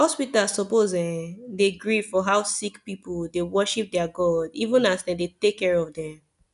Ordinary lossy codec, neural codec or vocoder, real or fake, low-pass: none; none; real; 10.8 kHz